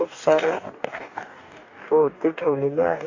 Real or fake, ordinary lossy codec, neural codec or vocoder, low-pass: fake; AAC, 48 kbps; codec, 44.1 kHz, 2.6 kbps, DAC; 7.2 kHz